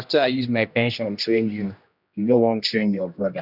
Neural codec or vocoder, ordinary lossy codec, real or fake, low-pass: codec, 16 kHz, 1 kbps, X-Codec, HuBERT features, trained on general audio; none; fake; 5.4 kHz